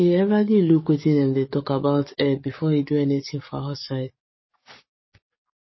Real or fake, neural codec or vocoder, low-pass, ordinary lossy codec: fake; codec, 44.1 kHz, 7.8 kbps, DAC; 7.2 kHz; MP3, 24 kbps